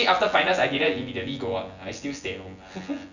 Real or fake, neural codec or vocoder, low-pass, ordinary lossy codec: fake; vocoder, 24 kHz, 100 mel bands, Vocos; 7.2 kHz; none